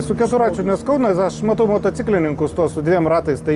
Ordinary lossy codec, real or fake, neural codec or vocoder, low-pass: Opus, 32 kbps; real; none; 10.8 kHz